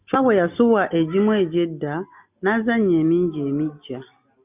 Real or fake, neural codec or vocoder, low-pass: real; none; 3.6 kHz